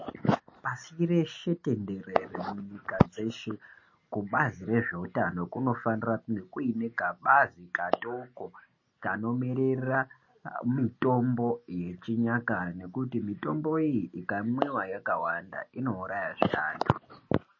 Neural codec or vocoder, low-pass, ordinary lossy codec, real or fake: none; 7.2 kHz; MP3, 32 kbps; real